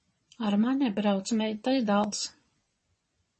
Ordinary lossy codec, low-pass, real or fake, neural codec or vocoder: MP3, 32 kbps; 10.8 kHz; fake; vocoder, 24 kHz, 100 mel bands, Vocos